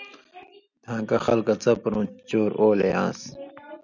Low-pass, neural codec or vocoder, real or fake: 7.2 kHz; none; real